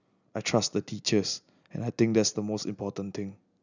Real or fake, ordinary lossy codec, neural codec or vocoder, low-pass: real; none; none; 7.2 kHz